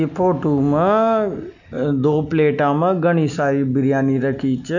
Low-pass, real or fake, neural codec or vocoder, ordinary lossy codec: 7.2 kHz; real; none; none